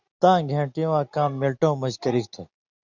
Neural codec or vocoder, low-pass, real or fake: none; 7.2 kHz; real